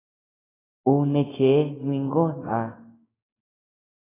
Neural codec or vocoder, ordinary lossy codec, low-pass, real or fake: codec, 16 kHz, 6 kbps, DAC; AAC, 16 kbps; 3.6 kHz; fake